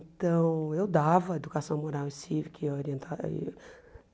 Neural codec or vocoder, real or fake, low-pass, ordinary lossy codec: none; real; none; none